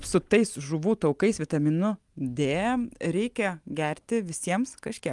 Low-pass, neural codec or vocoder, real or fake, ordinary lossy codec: 10.8 kHz; none; real; Opus, 32 kbps